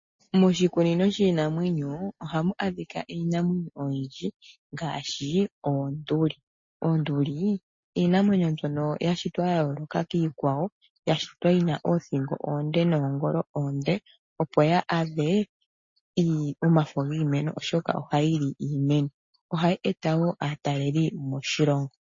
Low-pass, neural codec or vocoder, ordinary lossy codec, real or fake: 7.2 kHz; none; MP3, 32 kbps; real